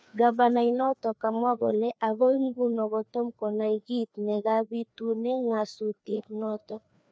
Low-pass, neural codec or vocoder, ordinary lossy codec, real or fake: none; codec, 16 kHz, 2 kbps, FreqCodec, larger model; none; fake